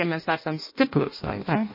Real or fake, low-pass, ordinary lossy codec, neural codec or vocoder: fake; 5.4 kHz; MP3, 24 kbps; codec, 16 kHz in and 24 kHz out, 0.6 kbps, FireRedTTS-2 codec